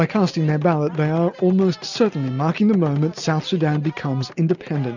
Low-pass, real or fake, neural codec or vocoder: 7.2 kHz; real; none